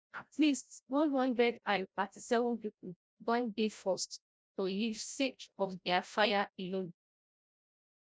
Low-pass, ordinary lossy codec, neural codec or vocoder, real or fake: none; none; codec, 16 kHz, 0.5 kbps, FreqCodec, larger model; fake